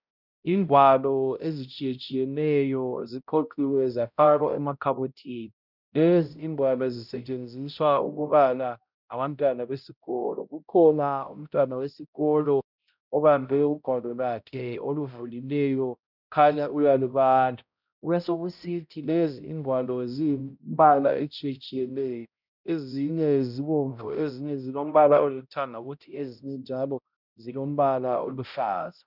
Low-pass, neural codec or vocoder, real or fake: 5.4 kHz; codec, 16 kHz, 0.5 kbps, X-Codec, HuBERT features, trained on balanced general audio; fake